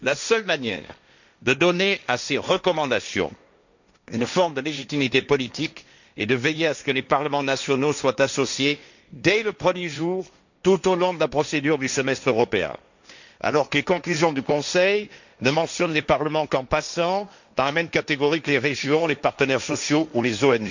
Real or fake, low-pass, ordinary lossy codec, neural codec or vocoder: fake; 7.2 kHz; none; codec, 16 kHz, 1.1 kbps, Voila-Tokenizer